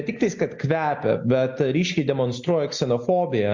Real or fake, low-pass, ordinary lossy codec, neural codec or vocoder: real; 7.2 kHz; MP3, 48 kbps; none